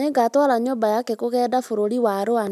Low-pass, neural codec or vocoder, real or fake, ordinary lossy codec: 14.4 kHz; none; real; none